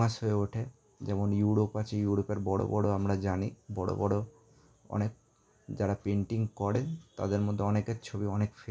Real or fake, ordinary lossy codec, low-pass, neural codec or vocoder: real; none; none; none